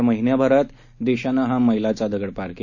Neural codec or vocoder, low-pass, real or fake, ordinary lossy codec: none; 7.2 kHz; real; none